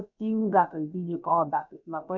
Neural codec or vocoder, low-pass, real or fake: codec, 16 kHz, about 1 kbps, DyCAST, with the encoder's durations; 7.2 kHz; fake